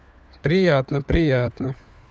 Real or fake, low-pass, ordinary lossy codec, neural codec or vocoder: fake; none; none; codec, 16 kHz, 4 kbps, FunCodec, trained on LibriTTS, 50 frames a second